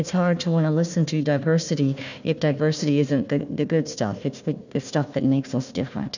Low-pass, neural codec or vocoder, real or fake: 7.2 kHz; codec, 16 kHz, 1 kbps, FunCodec, trained on Chinese and English, 50 frames a second; fake